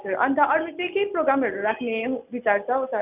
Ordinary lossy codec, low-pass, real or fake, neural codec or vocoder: none; 3.6 kHz; real; none